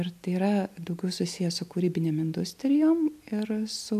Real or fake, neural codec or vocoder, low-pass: real; none; 14.4 kHz